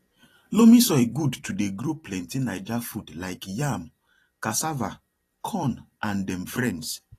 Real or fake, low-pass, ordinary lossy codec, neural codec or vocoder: fake; 14.4 kHz; AAC, 48 kbps; vocoder, 44.1 kHz, 128 mel bands every 256 samples, BigVGAN v2